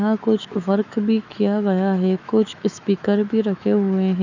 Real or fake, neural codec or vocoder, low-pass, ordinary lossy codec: fake; autoencoder, 48 kHz, 128 numbers a frame, DAC-VAE, trained on Japanese speech; 7.2 kHz; none